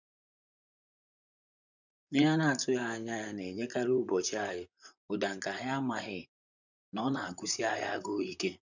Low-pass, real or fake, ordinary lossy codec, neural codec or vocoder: 7.2 kHz; fake; none; vocoder, 44.1 kHz, 128 mel bands, Pupu-Vocoder